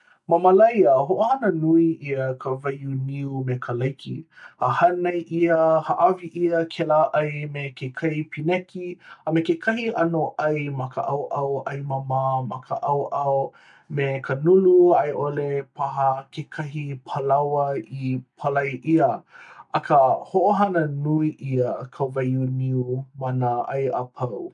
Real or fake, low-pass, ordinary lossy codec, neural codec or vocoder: real; 10.8 kHz; none; none